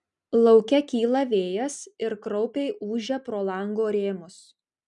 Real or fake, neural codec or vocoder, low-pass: real; none; 10.8 kHz